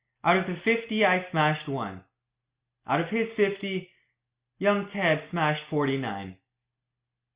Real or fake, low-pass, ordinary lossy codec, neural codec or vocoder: real; 3.6 kHz; Opus, 64 kbps; none